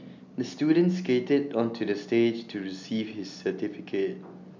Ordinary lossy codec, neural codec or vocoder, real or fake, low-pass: MP3, 64 kbps; none; real; 7.2 kHz